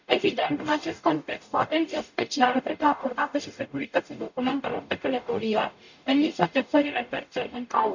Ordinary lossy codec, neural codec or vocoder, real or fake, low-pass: none; codec, 44.1 kHz, 0.9 kbps, DAC; fake; 7.2 kHz